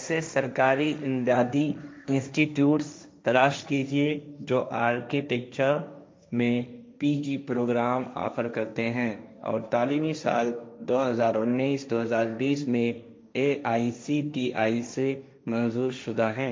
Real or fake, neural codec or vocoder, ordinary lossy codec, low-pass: fake; codec, 16 kHz, 1.1 kbps, Voila-Tokenizer; none; none